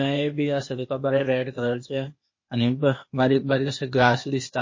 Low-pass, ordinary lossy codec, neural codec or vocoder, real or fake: 7.2 kHz; MP3, 32 kbps; codec, 16 kHz, 0.8 kbps, ZipCodec; fake